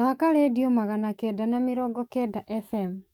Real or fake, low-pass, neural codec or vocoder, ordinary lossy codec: fake; 19.8 kHz; codec, 44.1 kHz, 7.8 kbps, DAC; none